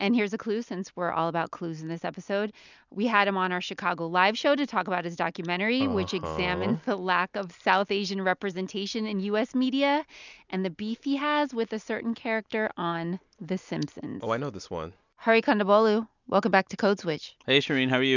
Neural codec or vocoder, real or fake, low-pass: none; real; 7.2 kHz